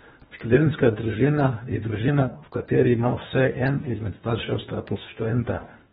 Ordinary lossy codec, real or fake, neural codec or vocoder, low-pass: AAC, 16 kbps; fake; codec, 24 kHz, 1.5 kbps, HILCodec; 10.8 kHz